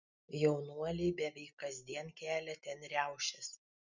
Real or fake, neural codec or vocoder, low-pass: real; none; 7.2 kHz